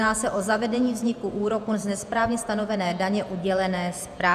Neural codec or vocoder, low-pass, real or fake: vocoder, 48 kHz, 128 mel bands, Vocos; 14.4 kHz; fake